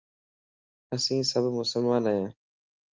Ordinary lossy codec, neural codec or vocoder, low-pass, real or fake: Opus, 32 kbps; none; 7.2 kHz; real